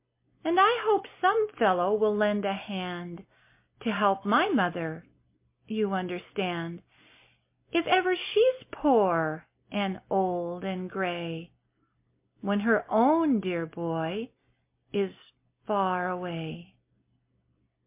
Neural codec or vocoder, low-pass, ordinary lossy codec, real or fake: none; 3.6 kHz; MP3, 24 kbps; real